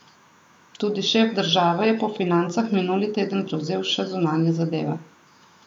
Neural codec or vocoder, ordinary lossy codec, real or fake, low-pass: autoencoder, 48 kHz, 128 numbers a frame, DAC-VAE, trained on Japanese speech; none; fake; 19.8 kHz